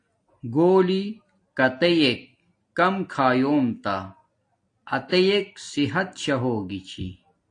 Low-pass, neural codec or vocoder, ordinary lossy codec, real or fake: 9.9 kHz; none; AAC, 48 kbps; real